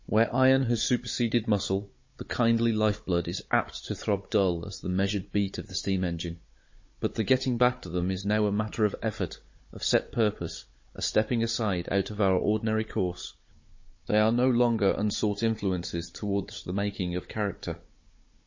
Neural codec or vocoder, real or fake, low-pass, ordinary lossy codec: codec, 16 kHz, 16 kbps, FunCodec, trained on Chinese and English, 50 frames a second; fake; 7.2 kHz; MP3, 32 kbps